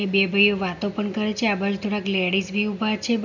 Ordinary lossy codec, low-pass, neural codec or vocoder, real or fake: none; 7.2 kHz; none; real